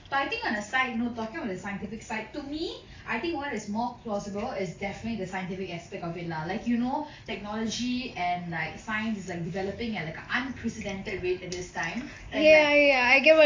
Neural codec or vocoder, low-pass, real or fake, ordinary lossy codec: none; 7.2 kHz; real; AAC, 32 kbps